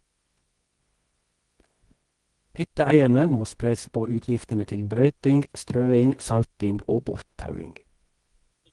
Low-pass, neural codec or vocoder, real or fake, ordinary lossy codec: 10.8 kHz; codec, 24 kHz, 0.9 kbps, WavTokenizer, medium music audio release; fake; Opus, 24 kbps